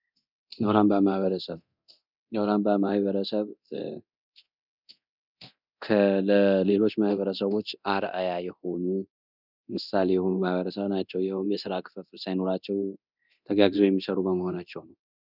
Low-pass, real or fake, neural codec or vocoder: 5.4 kHz; fake; codec, 24 kHz, 0.9 kbps, DualCodec